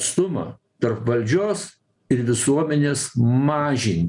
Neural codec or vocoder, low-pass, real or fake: none; 10.8 kHz; real